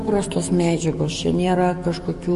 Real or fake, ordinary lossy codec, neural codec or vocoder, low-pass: fake; MP3, 48 kbps; codec, 44.1 kHz, 7.8 kbps, DAC; 14.4 kHz